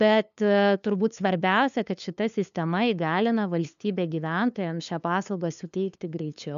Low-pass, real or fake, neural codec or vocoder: 7.2 kHz; fake; codec, 16 kHz, 2 kbps, FunCodec, trained on LibriTTS, 25 frames a second